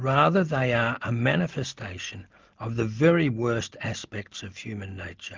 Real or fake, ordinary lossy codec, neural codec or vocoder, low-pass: real; Opus, 24 kbps; none; 7.2 kHz